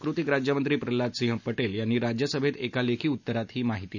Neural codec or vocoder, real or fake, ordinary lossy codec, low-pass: none; real; none; 7.2 kHz